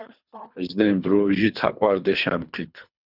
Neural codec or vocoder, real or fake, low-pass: codec, 24 kHz, 3 kbps, HILCodec; fake; 5.4 kHz